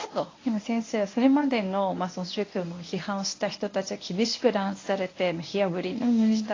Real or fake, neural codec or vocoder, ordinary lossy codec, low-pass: fake; codec, 24 kHz, 0.9 kbps, WavTokenizer, medium speech release version 1; AAC, 32 kbps; 7.2 kHz